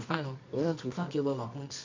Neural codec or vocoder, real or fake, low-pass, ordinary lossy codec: codec, 24 kHz, 0.9 kbps, WavTokenizer, medium music audio release; fake; 7.2 kHz; MP3, 64 kbps